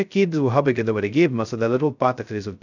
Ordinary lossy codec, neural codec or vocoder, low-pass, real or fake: none; codec, 16 kHz, 0.2 kbps, FocalCodec; 7.2 kHz; fake